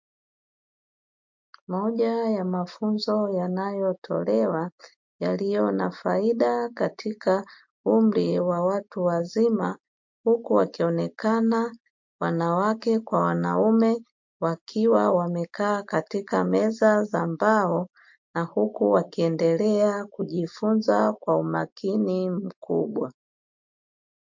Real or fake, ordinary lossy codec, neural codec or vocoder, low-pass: real; MP3, 48 kbps; none; 7.2 kHz